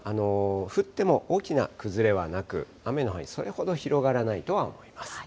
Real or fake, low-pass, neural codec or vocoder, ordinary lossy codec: real; none; none; none